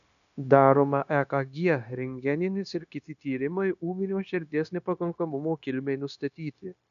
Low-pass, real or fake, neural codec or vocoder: 7.2 kHz; fake; codec, 16 kHz, 0.9 kbps, LongCat-Audio-Codec